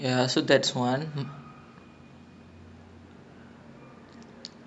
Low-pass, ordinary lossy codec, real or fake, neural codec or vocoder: 9.9 kHz; none; real; none